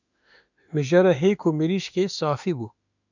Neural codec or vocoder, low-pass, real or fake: autoencoder, 48 kHz, 32 numbers a frame, DAC-VAE, trained on Japanese speech; 7.2 kHz; fake